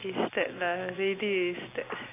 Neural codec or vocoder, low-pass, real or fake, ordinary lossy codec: none; 3.6 kHz; real; AAC, 24 kbps